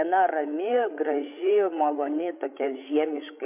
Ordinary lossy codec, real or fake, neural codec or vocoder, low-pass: MP3, 32 kbps; fake; codec, 16 kHz, 8 kbps, FreqCodec, larger model; 3.6 kHz